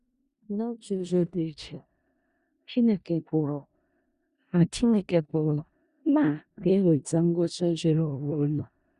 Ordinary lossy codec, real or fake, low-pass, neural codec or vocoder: Opus, 64 kbps; fake; 10.8 kHz; codec, 16 kHz in and 24 kHz out, 0.4 kbps, LongCat-Audio-Codec, four codebook decoder